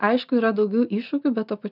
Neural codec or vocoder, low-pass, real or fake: none; 5.4 kHz; real